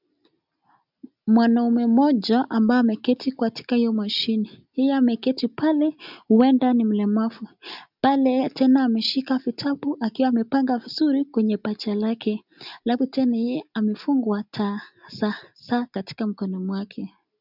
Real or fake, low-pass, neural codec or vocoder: real; 5.4 kHz; none